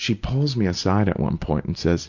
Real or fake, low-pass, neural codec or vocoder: real; 7.2 kHz; none